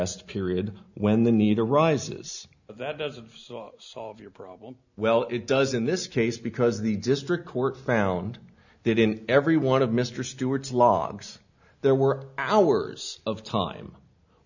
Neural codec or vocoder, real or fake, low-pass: none; real; 7.2 kHz